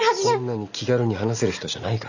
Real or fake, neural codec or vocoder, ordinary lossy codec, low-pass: real; none; none; 7.2 kHz